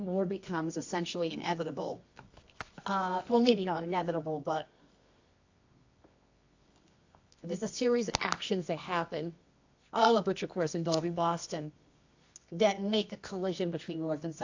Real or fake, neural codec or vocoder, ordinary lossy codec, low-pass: fake; codec, 24 kHz, 0.9 kbps, WavTokenizer, medium music audio release; AAC, 48 kbps; 7.2 kHz